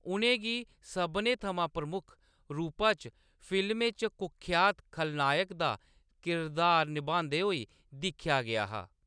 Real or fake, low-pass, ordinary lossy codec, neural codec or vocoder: real; 9.9 kHz; none; none